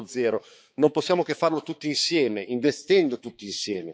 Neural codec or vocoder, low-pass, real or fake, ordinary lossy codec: codec, 16 kHz, 4 kbps, X-Codec, HuBERT features, trained on balanced general audio; none; fake; none